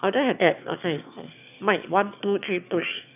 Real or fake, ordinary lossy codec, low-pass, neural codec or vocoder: fake; none; 3.6 kHz; autoencoder, 22.05 kHz, a latent of 192 numbers a frame, VITS, trained on one speaker